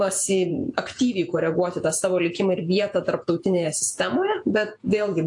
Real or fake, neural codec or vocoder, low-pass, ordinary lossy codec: fake; vocoder, 48 kHz, 128 mel bands, Vocos; 10.8 kHz; AAC, 64 kbps